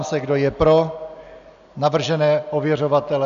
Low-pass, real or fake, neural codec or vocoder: 7.2 kHz; real; none